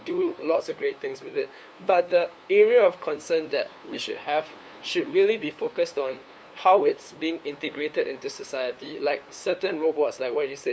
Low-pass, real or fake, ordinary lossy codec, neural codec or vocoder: none; fake; none; codec, 16 kHz, 2 kbps, FunCodec, trained on LibriTTS, 25 frames a second